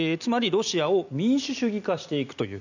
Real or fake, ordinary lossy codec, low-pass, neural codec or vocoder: real; none; 7.2 kHz; none